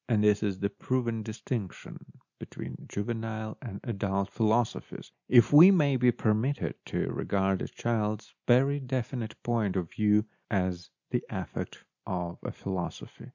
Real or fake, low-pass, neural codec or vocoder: real; 7.2 kHz; none